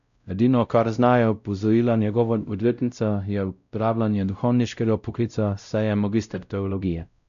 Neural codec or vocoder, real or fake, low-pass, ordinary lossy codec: codec, 16 kHz, 0.5 kbps, X-Codec, WavLM features, trained on Multilingual LibriSpeech; fake; 7.2 kHz; none